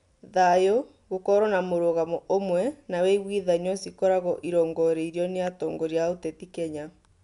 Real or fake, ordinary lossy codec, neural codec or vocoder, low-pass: real; none; none; 10.8 kHz